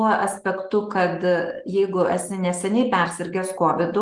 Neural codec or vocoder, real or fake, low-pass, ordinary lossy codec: none; real; 10.8 kHz; Opus, 24 kbps